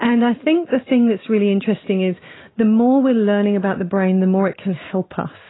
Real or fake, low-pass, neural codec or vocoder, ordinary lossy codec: real; 7.2 kHz; none; AAC, 16 kbps